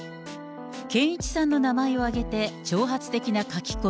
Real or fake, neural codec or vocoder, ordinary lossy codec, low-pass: real; none; none; none